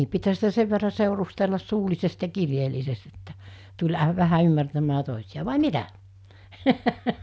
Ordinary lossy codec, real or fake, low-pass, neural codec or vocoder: none; real; none; none